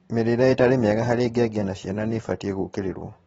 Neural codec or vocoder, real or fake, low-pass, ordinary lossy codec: vocoder, 44.1 kHz, 128 mel bands every 512 samples, BigVGAN v2; fake; 19.8 kHz; AAC, 24 kbps